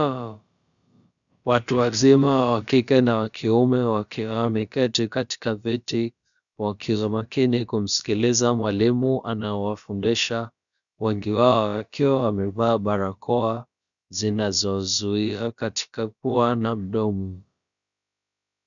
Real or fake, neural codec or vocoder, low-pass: fake; codec, 16 kHz, about 1 kbps, DyCAST, with the encoder's durations; 7.2 kHz